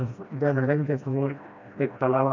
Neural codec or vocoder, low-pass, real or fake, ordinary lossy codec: codec, 16 kHz, 1 kbps, FreqCodec, smaller model; 7.2 kHz; fake; none